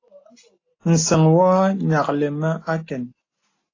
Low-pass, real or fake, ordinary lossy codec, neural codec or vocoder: 7.2 kHz; real; AAC, 32 kbps; none